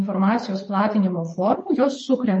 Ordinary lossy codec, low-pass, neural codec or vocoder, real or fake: MP3, 32 kbps; 9.9 kHz; codec, 24 kHz, 6 kbps, HILCodec; fake